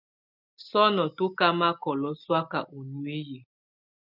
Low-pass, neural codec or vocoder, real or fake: 5.4 kHz; none; real